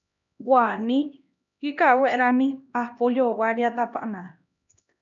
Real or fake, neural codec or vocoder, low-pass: fake; codec, 16 kHz, 1 kbps, X-Codec, HuBERT features, trained on LibriSpeech; 7.2 kHz